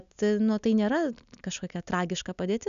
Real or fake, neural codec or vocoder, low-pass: real; none; 7.2 kHz